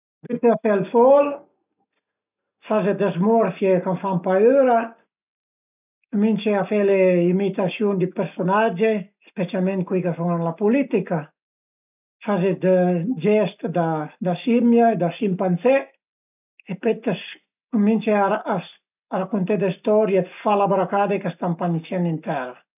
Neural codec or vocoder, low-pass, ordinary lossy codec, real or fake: none; 3.6 kHz; none; real